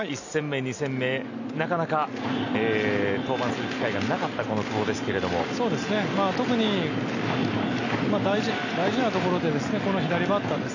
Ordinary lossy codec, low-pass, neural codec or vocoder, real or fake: none; 7.2 kHz; none; real